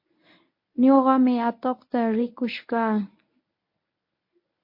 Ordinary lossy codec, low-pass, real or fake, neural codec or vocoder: MP3, 32 kbps; 5.4 kHz; fake; codec, 24 kHz, 0.9 kbps, WavTokenizer, medium speech release version 1